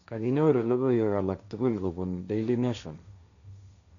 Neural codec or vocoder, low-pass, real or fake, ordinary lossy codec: codec, 16 kHz, 1.1 kbps, Voila-Tokenizer; 7.2 kHz; fake; none